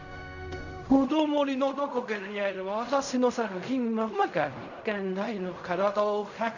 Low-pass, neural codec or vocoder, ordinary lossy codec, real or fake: 7.2 kHz; codec, 16 kHz in and 24 kHz out, 0.4 kbps, LongCat-Audio-Codec, fine tuned four codebook decoder; none; fake